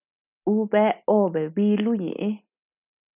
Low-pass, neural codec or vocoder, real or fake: 3.6 kHz; none; real